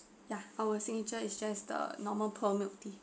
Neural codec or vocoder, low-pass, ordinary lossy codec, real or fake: none; none; none; real